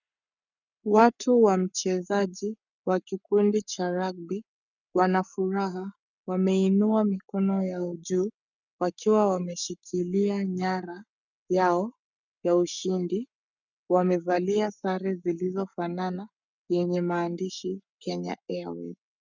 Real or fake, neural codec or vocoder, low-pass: fake; codec, 44.1 kHz, 7.8 kbps, Pupu-Codec; 7.2 kHz